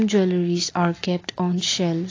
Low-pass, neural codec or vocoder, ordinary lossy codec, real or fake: 7.2 kHz; none; AAC, 32 kbps; real